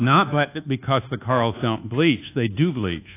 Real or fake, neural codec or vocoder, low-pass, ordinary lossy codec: fake; codec, 24 kHz, 1.2 kbps, DualCodec; 3.6 kHz; AAC, 24 kbps